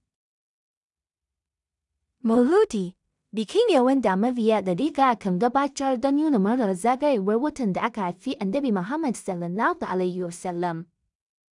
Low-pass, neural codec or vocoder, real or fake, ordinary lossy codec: 10.8 kHz; codec, 16 kHz in and 24 kHz out, 0.4 kbps, LongCat-Audio-Codec, two codebook decoder; fake; none